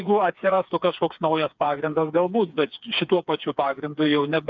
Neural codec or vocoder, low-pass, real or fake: codec, 16 kHz, 4 kbps, FreqCodec, smaller model; 7.2 kHz; fake